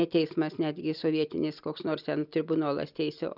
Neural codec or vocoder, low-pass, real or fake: none; 5.4 kHz; real